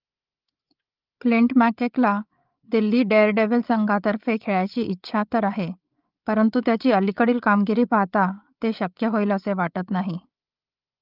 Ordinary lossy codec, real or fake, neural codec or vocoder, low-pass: Opus, 32 kbps; fake; codec, 16 kHz, 8 kbps, FreqCodec, larger model; 5.4 kHz